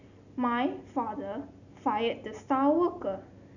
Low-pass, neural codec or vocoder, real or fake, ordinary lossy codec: 7.2 kHz; none; real; none